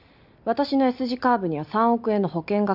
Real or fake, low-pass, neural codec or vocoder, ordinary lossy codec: real; 5.4 kHz; none; none